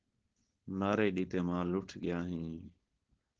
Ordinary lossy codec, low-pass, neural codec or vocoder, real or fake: Opus, 16 kbps; 7.2 kHz; codec, 16 kHz, 4.8 kbps, FACodec; fake